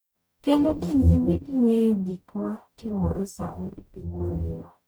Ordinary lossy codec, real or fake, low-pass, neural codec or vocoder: none; fake; none; codec, 44.1 kHz, 0.9 kbps, DAC